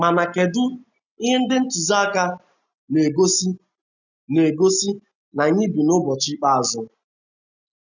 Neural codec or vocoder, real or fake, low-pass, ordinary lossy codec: none; real; 7.2 kHz; none